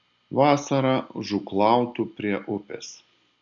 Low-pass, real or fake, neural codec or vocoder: 7.2 kHz; real; none